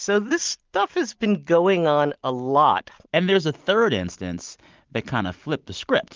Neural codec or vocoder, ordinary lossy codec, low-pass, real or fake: none; Opus, 24 kbps; 7.2 kHz; real